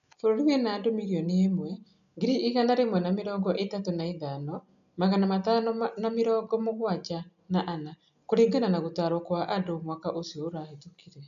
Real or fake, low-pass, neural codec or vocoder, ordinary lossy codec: real; 7.2 kHz; none; none